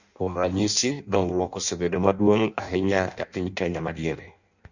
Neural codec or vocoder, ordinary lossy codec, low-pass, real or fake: codec, 16 kHz in and 24 kHz out, 0.6 kbps, FireRedTTS-2 codec; none; 7.2 kHz; fake